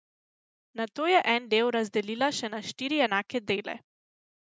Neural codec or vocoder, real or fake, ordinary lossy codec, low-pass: none; real; none; none